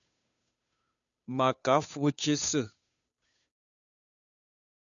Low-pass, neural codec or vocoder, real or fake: 7.2 kHz; codec, 16 kHz, 2 kbps, FunCodec, trained on Chinese and English, 25 frames a second; fake